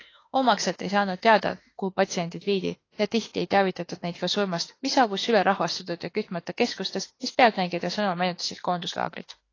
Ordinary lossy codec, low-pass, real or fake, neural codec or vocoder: AAC, 32 kbps; 7.2 kHz; fake; autoencoder, 48 kHz, 32 numbers a frame, DAC-VAE, trained on Japanese speech